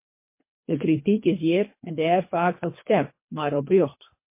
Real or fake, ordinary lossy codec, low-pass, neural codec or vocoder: fake; MP3, 24 kbps; 3.6 kHz; codec, 24 kHz, 3 kbps, HILCodec